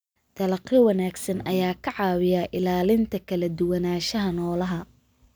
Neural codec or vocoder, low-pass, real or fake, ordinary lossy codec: none; none; real; none